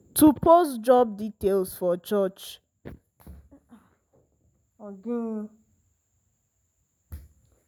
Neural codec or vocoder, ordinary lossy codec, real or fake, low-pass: none; none; real; none